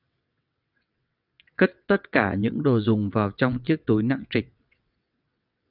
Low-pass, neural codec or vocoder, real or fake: 5.4 kHz; vocoder, 22.05 kHz, 80 mel bands, WaveNeXt; fake